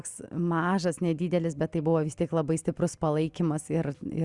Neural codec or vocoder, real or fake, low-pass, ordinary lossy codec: none; real; 10.8 kHz; Opus, 64 kbps